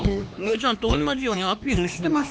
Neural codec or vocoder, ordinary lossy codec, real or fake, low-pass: codec, 16 kHz, 2 kbps, X-Codec, HuBERT features, trained on LibriSpeech; none; fake; none